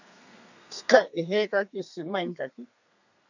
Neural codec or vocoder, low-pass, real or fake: codec, 32 kHz, 1.9 kbps, SNAC; 7.2 kHz; fake